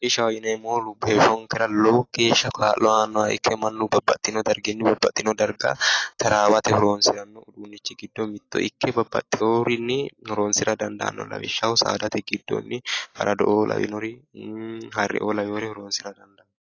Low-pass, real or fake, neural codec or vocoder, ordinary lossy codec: 7.2 kHz; real; none; AAC, 32 kbps